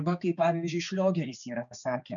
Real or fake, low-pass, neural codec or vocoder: fake; 7.2 kHz; codec, 16 kHz, 4 kbps, X-Codec, HuBERT features, trained on balanced general audio